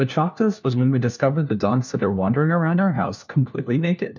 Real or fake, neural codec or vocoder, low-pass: fake; codec, 16 kHz, 1 kbps, FunCodec, trained on LibriTTS, 50 frames a second; 7.2 kHz